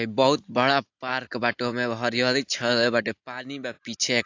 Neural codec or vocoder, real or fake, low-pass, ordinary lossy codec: none; real; 7.2 kHz; AAC, 48 kbps